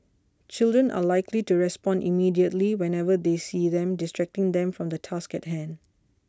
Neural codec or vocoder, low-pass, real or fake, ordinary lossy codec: none; none; real; none